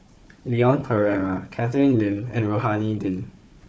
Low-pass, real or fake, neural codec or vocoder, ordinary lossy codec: none; fake; codec, 16 kHz, 4 kbps, FunCodec, trained on Chinese and English, 50 frames a second; none